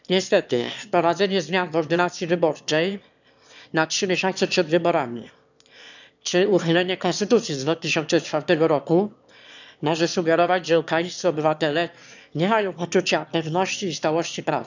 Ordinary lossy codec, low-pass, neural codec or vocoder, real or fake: none; 7.2 kHz; autoencoder, 22.05 kHz, a latent of 192 numbers a frame, VITS, trained on one speaker; fake